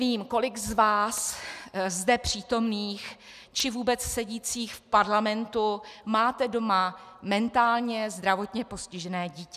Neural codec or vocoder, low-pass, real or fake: none; 14.4 kHz; real